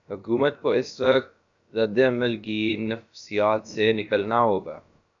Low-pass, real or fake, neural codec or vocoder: 7.2 kHz; fake; codec, 16 kHz, about 1 kbps, DyCAST, with the encoder's durations